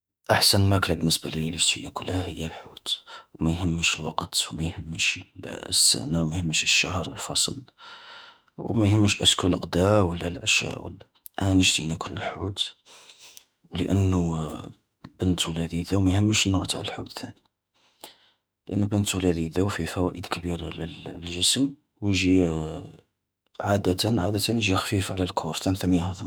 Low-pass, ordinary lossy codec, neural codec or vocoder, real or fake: none; none; autoencoder, 48 kHz, 32 numbers a frame, DAC-VAE, trained on Japanese speech; fake